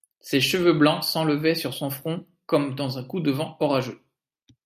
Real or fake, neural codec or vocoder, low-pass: real; none; 14.4 kHz